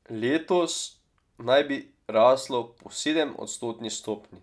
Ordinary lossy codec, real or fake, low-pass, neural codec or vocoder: none; real; none; none